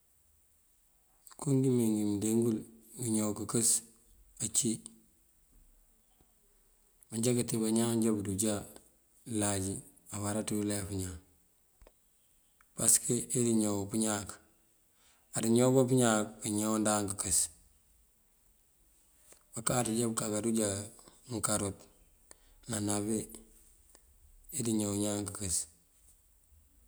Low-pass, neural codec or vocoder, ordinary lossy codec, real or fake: none; none; none; real